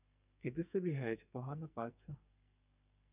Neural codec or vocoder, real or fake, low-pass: codec, 44.1 kHz, 2.6 kbps, SNAC; fake; 3.6 kHz